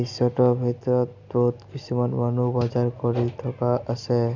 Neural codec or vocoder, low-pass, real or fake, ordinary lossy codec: none; 7.2 kHz; real; none